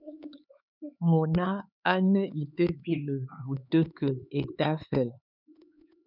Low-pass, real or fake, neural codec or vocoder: 5.4 kHz; fake; codec, 16 kHz, 4 kbps, X-Codec, HuBERT features, trained on LibriSpeech